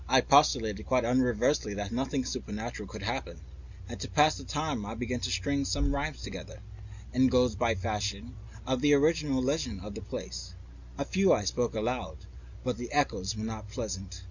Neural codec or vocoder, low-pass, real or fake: none; 7.2 kHz; real